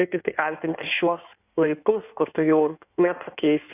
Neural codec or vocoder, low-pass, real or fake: codec, 16 kHz in and 24 kHz out, 1.1 kbps, FireRedTTS-2 codec; 3.6 kHz; fake